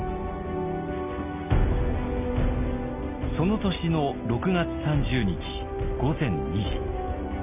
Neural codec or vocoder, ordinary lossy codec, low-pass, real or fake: none; none; 3.6 kHz; real